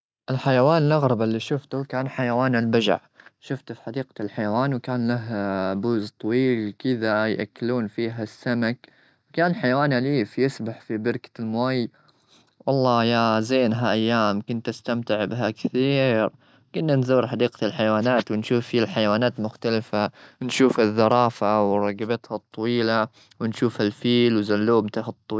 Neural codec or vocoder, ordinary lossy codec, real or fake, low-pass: none; none; real; none